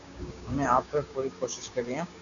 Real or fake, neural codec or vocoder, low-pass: fake; codec, 16 kHz, 6 kbps, DAC; 7.2 kHz